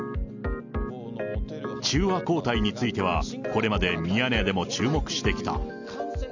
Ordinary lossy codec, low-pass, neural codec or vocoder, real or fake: none; 7.2 kHz; none; real